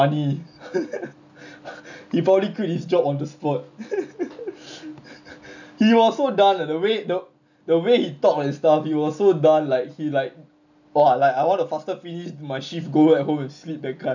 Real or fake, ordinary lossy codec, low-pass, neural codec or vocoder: real; none; 7.2 kHz; none